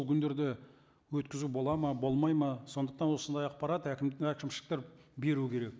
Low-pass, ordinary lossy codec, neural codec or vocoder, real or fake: none; none; none; real